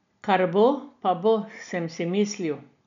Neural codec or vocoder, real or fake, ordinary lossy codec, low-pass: none; real; none; 7.2 kHz